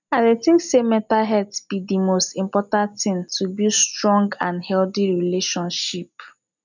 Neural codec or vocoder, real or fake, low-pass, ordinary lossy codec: none; real; 7.2 kHz; none